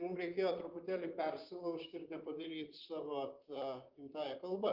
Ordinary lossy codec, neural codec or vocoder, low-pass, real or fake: Opus, 32 kbps; vocoder, 44.1 kHz, 128 mel bands, Pupu-Vocoder; 5.4 kHz; fake